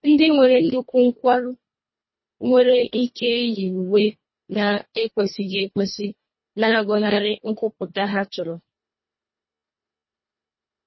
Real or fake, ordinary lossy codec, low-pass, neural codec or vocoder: fake; MP3, 24 kbps; 7.2 kHz; codec, 24 kHz, 1.5 kbps, HILCodec